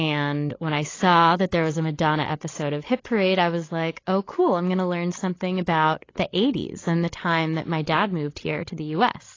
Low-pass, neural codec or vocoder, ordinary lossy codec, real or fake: 7.2 kHz; codec, 16 kHz, 16 kbps, FreqCodec, larger model; AAC, 32 kbps; fake